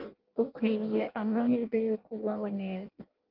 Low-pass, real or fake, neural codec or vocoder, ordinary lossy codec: 5.4 kHz; fake; codec, 16 kHz in and 24 kHz out, 0.6 kbps, FireRedTTS-2 codec; Opus, 32 kbps